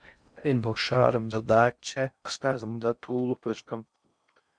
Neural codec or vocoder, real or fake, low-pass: codec, 16 kHz in and 24 kHz out, 0.6 kbps, FocalCodec, streaming, 4096 codes; fake; 9.9 kHz